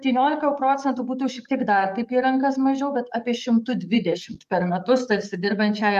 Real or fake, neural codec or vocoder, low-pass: fake; codec, 44.1 kHz, 7.8 kbps, DAC; 14.4 kHz